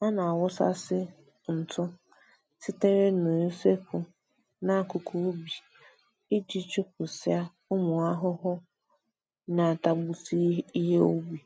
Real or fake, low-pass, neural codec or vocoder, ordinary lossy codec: real; none; none; none